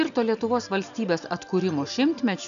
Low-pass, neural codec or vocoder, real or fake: 7.2 kHz; none; real